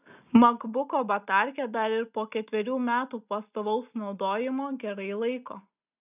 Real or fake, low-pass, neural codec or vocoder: real; 3.6 kHz; none